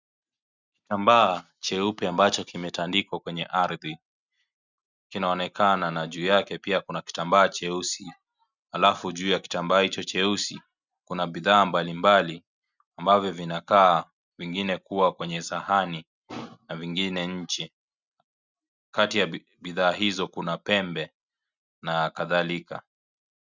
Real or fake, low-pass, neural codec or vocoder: real; 7.2 kHz; none